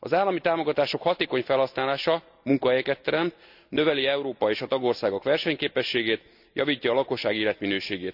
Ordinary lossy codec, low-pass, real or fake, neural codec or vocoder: none; 5.4 kHz; real; none